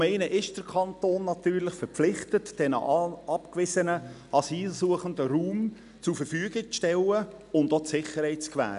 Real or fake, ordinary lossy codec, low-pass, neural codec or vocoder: real; none; 10.8 kHz; none